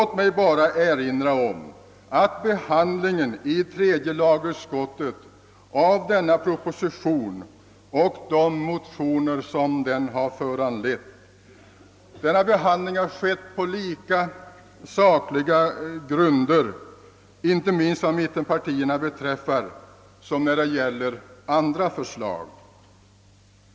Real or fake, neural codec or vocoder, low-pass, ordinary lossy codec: real; none; none; none